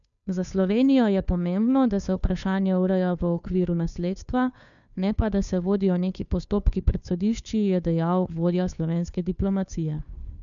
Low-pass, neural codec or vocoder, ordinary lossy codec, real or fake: 7.2 kHz; codec, 16 kHz, 2 kbps, FunCodec, trained on Chinese and English, 25 frames a second; none; fake